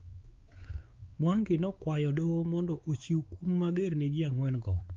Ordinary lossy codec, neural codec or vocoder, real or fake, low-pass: Opus, 32 kbps; codec, 16 kHz, 4 kbps, X-Codec, WavLM features, trained on Multilingual LibriSpeech; fake; 7.2 kHz